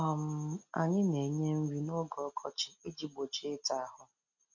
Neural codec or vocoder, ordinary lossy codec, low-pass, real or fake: none; none; 7.2 kHz; real